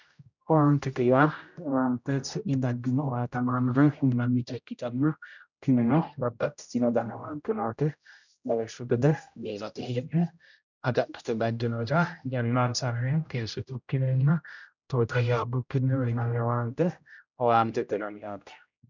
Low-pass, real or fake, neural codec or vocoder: 7.2 kHz; fake; codec, 16 kHz, 0.5 kbps, X-Codec, HuBERT features, trained on general audio